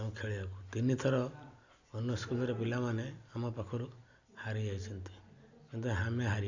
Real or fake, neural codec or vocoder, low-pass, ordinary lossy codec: real; none; 7.2 kHz; Opus, 64 kbps